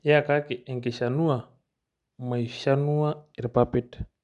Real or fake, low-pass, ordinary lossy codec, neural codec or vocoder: real; 10.8 kHz; none; none